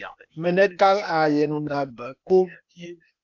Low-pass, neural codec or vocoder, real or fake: 7.2 kHz; codec, 16 kHz, 0.8 kbps, ZipCodec; fake